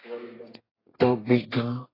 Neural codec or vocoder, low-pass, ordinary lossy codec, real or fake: codec, 32 kHz, 1.9 kbps, SNAC; 5.4 kHz; AAC, 24 kbps; fake